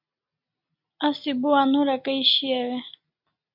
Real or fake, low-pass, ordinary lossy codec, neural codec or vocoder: real; 5.4 kHz; AAC, 48 kbps; none